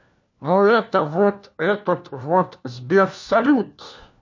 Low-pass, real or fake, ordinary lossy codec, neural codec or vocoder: 7.2 kHz; fake; MP3, 48 kbps; codec, 16 kHz, 1 kbps, FunCodec, trained on LibriTTS, 50 frames a second